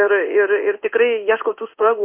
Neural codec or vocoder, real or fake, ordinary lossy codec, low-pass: codec, 16 kHz in and 24 kHz out, 1 kbps, XY-Tokenizer; fake; Opus, 64 kbps; 3.6 kHz